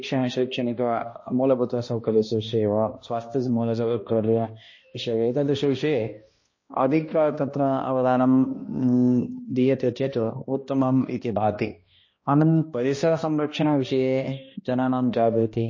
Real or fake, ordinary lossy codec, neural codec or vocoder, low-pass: fake; MP3, 32 kbps; codec, 16 kHz, 1 kbps, X-Codec, HuBERT features, trained on balanced general audio; 7.2 kHz